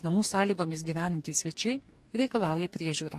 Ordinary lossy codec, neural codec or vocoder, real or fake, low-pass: AAC, 64 kbps; codec, 44.1 kHz, 2.6 kbps, DAC; fake; 14.4 kHz